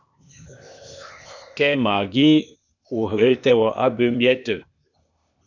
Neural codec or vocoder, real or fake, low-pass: codec, 16 kHz, 0.8 kbps, ZipCodec; fake; 7.2 kHz